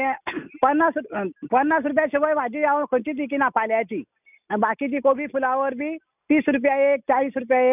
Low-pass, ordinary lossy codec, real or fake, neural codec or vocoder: 3.6 kHz; none; real; none